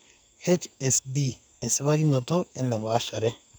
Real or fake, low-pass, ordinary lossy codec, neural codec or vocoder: fake; none; none; codec, 44.1 kHz, 2.6 kbps, SNAC